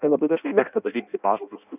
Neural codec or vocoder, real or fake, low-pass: codec, 16 kHz in and 24 kHz out, 0.6 kbps, FireRedTTS-2 codec; fake; 3.6 kHz